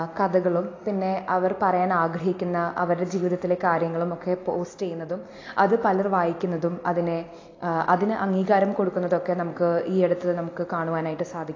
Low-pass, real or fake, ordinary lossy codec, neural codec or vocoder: 7.2 kHz; real; AAC, 32 kbps; none